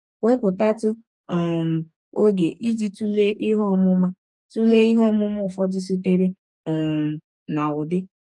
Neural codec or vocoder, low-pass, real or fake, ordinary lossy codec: codec, 44.1 kHz, 2.6 kbps, DAC; 10.8 kHz; fake; none